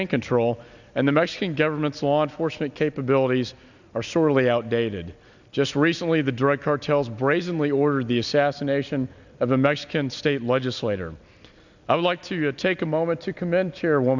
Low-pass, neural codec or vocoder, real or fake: 7.2 kHz; none; real